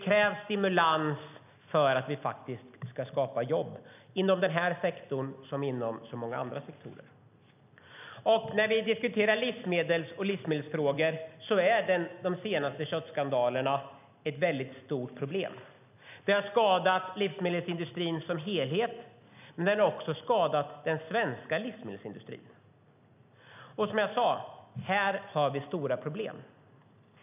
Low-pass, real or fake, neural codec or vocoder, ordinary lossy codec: 3.6 kHz; real; none; none